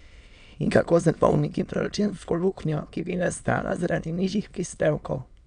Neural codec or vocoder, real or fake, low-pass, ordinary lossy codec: autoencoder, 22.05 kHz, a latent of 192 numbers a frame, VITS, trained on many speakers; fake; 9.9 kHz; none